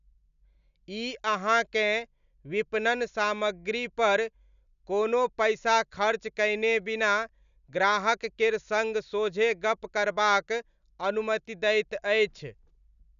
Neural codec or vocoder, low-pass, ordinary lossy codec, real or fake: none; 7.2 kHz; none; real